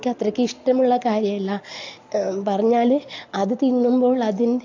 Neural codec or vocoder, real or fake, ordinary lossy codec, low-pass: none; real; AAC, 48 kbps; 7.2 kHz